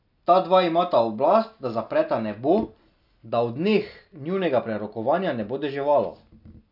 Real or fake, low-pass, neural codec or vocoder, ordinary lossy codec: real; 5.4 kHz; none; none